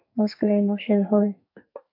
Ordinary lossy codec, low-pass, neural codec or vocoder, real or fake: AAC, 48 kbps; 5.4 kHz; codec, 44.1 kHz, 2.6 kbps, SNAC; fake